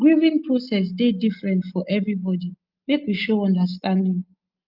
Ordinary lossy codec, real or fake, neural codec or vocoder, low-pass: Opus, 24 kbps; real; none; 5.4 kHz